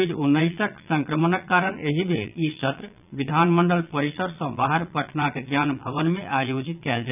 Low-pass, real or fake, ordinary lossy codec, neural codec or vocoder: 3.6 kHz; fake; none; vocoder, 22.05 kHz, 80 mel bands, Vocos